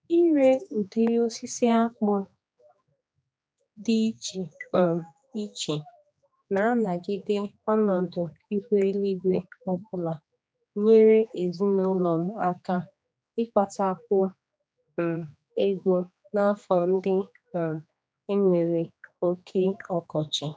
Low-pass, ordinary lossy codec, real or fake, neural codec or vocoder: none; none; fake; codec, 16 kHz, 2 kbps, X-Codec, HuBERT features, trained on general audio